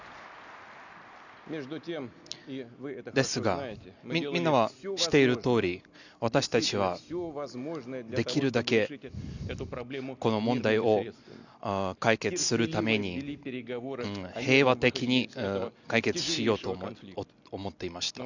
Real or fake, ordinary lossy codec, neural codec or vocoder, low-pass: real; none; none; 7.2 kHz